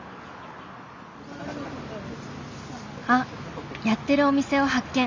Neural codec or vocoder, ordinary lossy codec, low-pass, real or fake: none; MP3, 64 kbps; 7.2 kHz; real